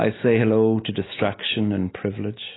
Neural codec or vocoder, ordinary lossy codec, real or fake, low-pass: none; AAC, 16 kbps; real; 7.2 kHz